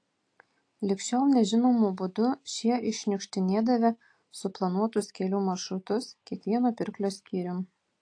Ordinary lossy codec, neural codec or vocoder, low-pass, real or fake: AAC, 48 kbps; none; 9.9 kHz; real